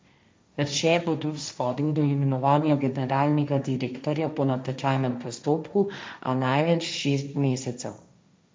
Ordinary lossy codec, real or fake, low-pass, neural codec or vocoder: none; fake; none; codec, 16 kHz, 1.1 kbps, Voila-Tokenizer